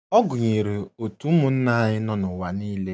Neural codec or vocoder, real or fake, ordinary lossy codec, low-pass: none; real; none; none